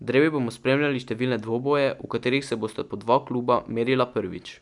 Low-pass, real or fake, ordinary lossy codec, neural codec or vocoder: 10.8 kHz; real; none; none